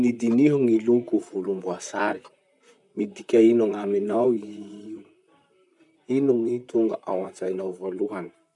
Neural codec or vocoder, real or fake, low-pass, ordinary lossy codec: vocoder, 44.1 kHz, 128 mel bands, Pupu-Vocoder; fake; 10.8 kHz; none